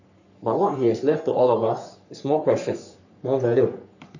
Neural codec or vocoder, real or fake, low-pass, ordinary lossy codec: codec, 44.1 kHz, 3.4 kbps, Pupu-Codec; fake; 7.2 kHz; none